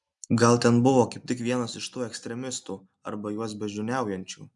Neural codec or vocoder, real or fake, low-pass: none; real; 10.8 kHz